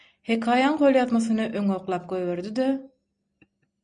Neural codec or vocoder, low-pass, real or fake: none; 9.9 kHz; real